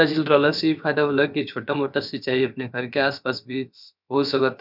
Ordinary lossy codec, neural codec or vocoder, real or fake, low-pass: none; codec, 16 kHz, about 1 kbps, DyCAST, with the encoder's durations; fake; 5.4 kHz